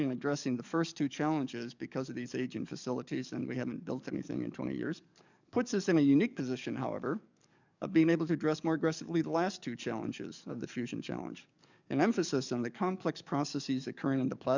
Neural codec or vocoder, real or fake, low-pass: codec, 44.1 kHz, 7.8 kbps, DAC; fake; 7.2 kHz